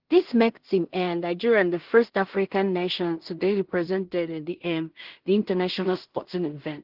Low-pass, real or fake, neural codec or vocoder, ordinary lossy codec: 5.4 kHz; fake; codec, 16 kHz in and 24 kHz out, 0.4 kbps, LongCat-Audio-Codec, two codebook decoder; Opus, 16 kbps